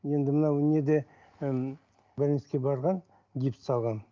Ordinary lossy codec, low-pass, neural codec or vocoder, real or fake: Opus, 24 kbps; 7.2 kHz; none; real